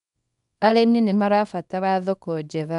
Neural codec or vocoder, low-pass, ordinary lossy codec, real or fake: codec, 24 kHz, 0.9 kbps, WavTokenizer, small release; 10.8 kHz; none; fake